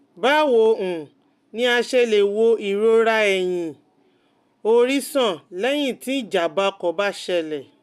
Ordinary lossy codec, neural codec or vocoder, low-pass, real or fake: none; none; 14.4 kHz; real